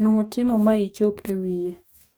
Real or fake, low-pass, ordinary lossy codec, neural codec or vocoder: fake; none; none; codec, 44.1 kHz, 2.6 kbps, DAC